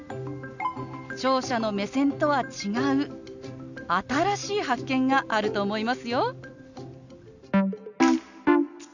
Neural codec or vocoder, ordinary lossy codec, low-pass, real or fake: none; none; 7.2 kHz; real